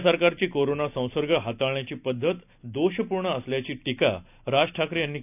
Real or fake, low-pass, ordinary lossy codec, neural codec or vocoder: real; 3.6 kHz; none; none